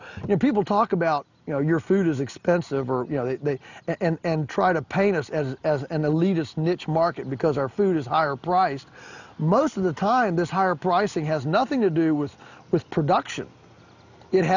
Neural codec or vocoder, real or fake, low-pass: none; real; 7.2 kHz